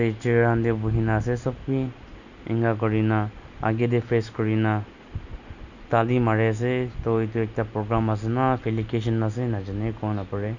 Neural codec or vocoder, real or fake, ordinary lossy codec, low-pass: none; real; none; 7.2 kHz